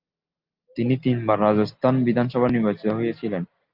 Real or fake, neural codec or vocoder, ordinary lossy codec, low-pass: real; none; Opus, 32 kbps; 5.4 kHz